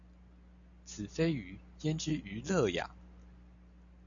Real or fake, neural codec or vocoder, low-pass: real; none; 7.2 kHz